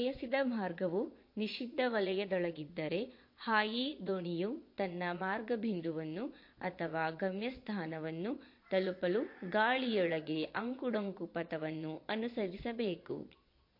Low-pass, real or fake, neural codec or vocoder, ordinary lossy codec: 5.4 kHz; fake; vocoder, 22.05 kHz, 80 mel bands, Vocos; MP3, 32 kbps